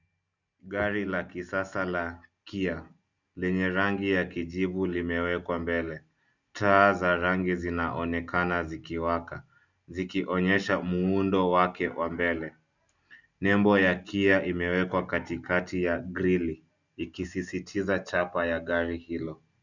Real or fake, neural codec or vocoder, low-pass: real; none; 7.2 kHz